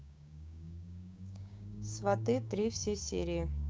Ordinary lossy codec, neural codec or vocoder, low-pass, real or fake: none; codec, 16 kHz, 6 kbps, DAC; none; fake